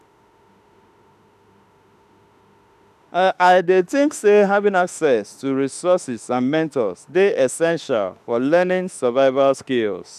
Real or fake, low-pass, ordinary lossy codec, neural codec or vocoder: fake; 14.4 kHz; none; autoencoder, 48 kHz, 32 numbers a frame, DAC-VAE, trained on Japanese speech